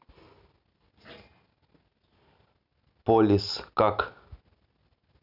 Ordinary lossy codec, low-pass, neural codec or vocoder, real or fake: none; 5.4 kHz; none; real